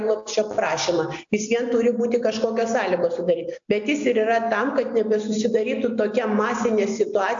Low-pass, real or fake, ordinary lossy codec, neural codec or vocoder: 7.2 kHz; real; AAC, 64 kbps; none